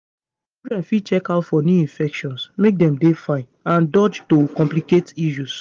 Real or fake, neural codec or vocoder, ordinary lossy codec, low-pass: real; none; Opus, 32 kbps; 7.2 kHz